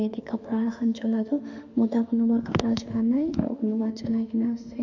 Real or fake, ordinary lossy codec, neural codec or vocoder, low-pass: fake; none; autoencoder, 48 kHz, 32 numbers a frame, DAC-VAE, trained on Japanese speech; 7.2 kHz